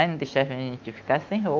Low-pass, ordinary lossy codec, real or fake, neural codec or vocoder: 7.2 kHz; Opus, 24 kbps; fake; autoencoder, 48 kHz, 128 numbers a frame, DAC-VAE, trained on Japanese speech